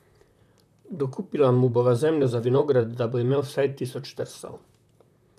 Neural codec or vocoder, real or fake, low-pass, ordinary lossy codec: vocoder, 44.1 kHz, 128 mel bands, Pupu-Vocoder; fake; 14.4 kHz; none